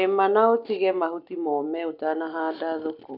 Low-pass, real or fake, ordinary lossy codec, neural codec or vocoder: 5.4 kHz; real; none; none